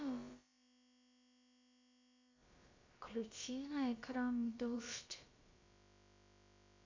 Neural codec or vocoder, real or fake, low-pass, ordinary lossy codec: codec, 16 kHz, about 1 kbps, DyCAST, with the encoder's durations; fake; 7.2 kHz; MP3, 64 kbps